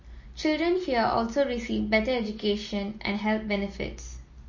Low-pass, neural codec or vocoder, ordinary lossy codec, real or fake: 7.2 kHz; none; MP3, 32 kbps; real